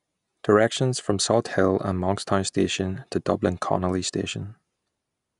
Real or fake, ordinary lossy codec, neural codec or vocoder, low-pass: real; none; none; 10.8 kHz